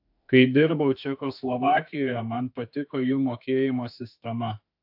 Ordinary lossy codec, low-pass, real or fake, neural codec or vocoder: AAC, 48 kbps; 5.4 kHz; fake; autoencoder, 48 kHz, 32 numbers a frame, DAC-VAE, trained on Japanese speech